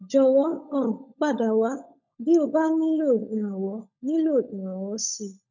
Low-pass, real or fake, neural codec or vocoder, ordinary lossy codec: 7.2 kHz; fake; codec, 16 kHz, 16 kbps, FunCodec, trained on LibriTTS, 50 frames a second; none